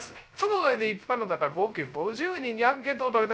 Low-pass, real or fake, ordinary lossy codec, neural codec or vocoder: none; fake; none; codec, 16 kHz, 0.3 kbps, FocalCodec